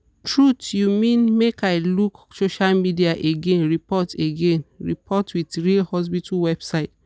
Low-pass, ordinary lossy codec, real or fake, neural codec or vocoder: none; none; real; none